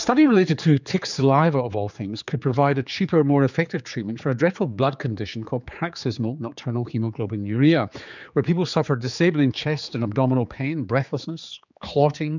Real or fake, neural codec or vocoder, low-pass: fake; codec, 16 kHz, 4 kbps, X-Codec, HuBERT features, trained on general audio; 7.2 kHz